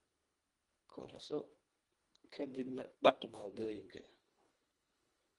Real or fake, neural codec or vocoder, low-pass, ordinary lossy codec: fake; codec, 24 kHz, 1.5 kbps, HILCodec; 10.8 kHz; Opus, 32 kbps